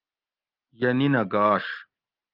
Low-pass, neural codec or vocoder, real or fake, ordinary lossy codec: 5.4 kHz; none; real; Opus, 32 kbps